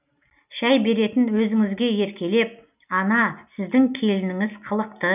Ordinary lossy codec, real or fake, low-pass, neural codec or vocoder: none; real; 3.6 kHz; none